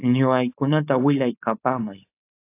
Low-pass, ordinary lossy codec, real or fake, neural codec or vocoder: 3.6 kHz; AAC, 24 kbps; fake; codec, 16 kHz, 4.8 kbps, FACodec